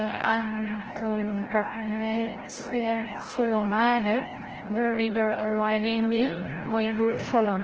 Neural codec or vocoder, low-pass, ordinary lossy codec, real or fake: codec, 16 kHz, 0.5 kbps, FreqCodec, larger model; 7.2 kHz; Opus, 16 kbps; fake